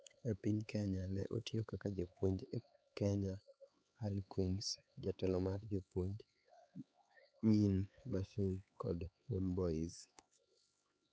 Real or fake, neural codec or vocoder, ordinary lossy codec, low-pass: fake; codec, 16 kHz, 4 kbps, X-Codec, HuBERT features, trained on LibriSpeech; none; none